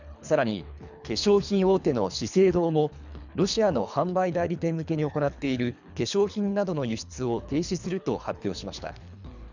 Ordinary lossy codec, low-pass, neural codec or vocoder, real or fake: none; 7.2 kHz; codec, 24 kHz, 3 kbps, HILCodec; fake